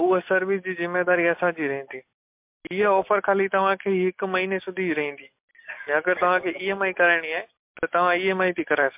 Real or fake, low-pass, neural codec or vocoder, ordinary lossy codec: real; 3.6 kHz; none; MP3, 32 kbps